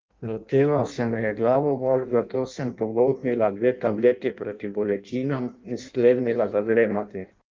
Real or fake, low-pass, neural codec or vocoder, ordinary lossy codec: fake; 7.2 kHz; codec, 16 kHz in and 24 kHz out, 0.6 kbps, FireRedTTS-2 codec; Opus, 32 kbps